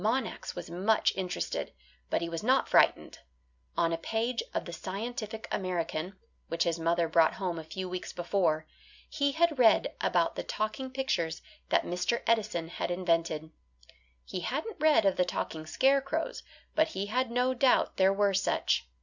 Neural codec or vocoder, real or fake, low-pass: none; real; 7.2 kHz